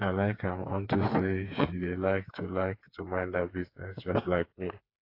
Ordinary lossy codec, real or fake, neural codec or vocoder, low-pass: AAC, 32 kbps; fake; codec, 16 kHz, 4 kbps, FreqCodec, smaller model; 5.4 kHz